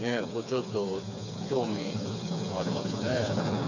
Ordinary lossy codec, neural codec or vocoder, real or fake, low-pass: none; codec, 16 kHz, 4 kbps, FreqCodec, smaller model; fake; 7.2 kHz